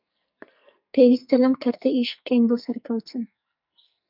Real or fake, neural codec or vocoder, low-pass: fake; codec, 44.1 kHz, 2.6 kbps, SNAC; 5.4 kHz